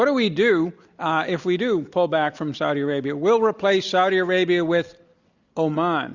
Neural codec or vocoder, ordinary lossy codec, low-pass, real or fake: none; Opus, 64 kbps; 7.2 kHz; real